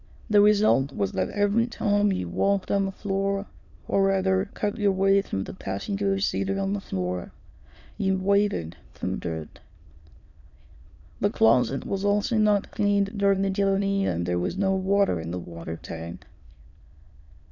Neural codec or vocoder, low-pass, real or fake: autoencoder, 22.05 kHz, a latent of 192 numbers a frame, VITS, trained on many speakers; 7.2 kHz; fake